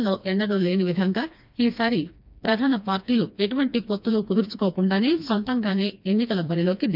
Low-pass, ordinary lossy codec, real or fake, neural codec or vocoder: 5.4 kHz; none; fake; codec, 16 kHz, 2 kbps, FreqCodec, smaller model